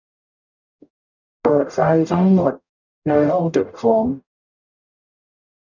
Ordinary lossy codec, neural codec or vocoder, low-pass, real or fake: none; codec, 44.1 kHz, 0.9 kbps, DAC; 7.2 kHz; fake